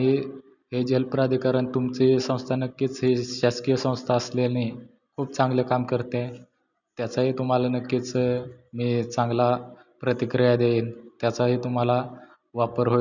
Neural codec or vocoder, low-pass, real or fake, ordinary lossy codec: none; 7.2 kHz; real; none